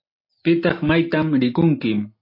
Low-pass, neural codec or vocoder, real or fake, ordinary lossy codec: 5.4 kHz; none; real; MP3, 32 kbps